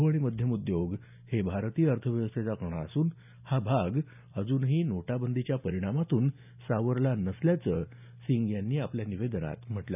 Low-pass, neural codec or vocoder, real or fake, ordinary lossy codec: 3.6 kHz; none; real; none